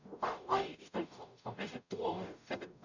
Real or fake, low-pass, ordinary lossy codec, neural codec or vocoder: fake; 7.2 kHz; none; codec, 44.1 kHz, 0.9 kbps, DAC